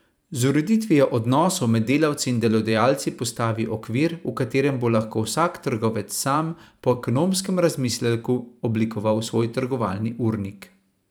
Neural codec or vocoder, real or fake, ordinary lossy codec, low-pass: vocoder, 44.1 kHz, 128 mel bands every 512 samples, BigVGAN v2; fake; none; none